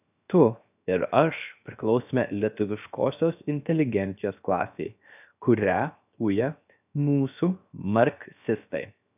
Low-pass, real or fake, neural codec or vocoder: 3.6 kHz; fake; codec, 16 kHz, 0.7 kbps, FocalCodec